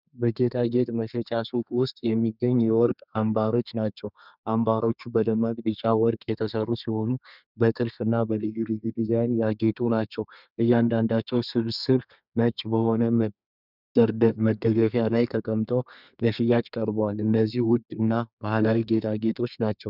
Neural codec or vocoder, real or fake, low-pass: codec, 32 kHz, 1.9 kbps, SNAC; fake; 5.4 kHz